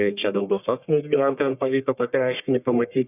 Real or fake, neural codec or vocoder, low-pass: fake; codec, 44.1 kHz, 1.7 kbps, Pupu-Codec; 3.6 kHz